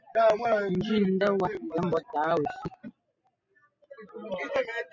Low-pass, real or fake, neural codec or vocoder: 7.2 kHz; real; none